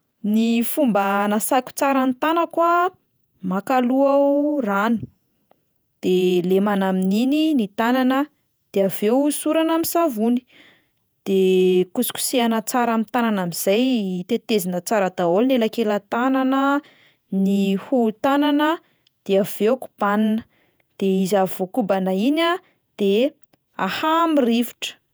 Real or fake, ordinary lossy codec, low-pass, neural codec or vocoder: fake; none; none; vocoder, 48 kHz, 128 mel bands, Vocos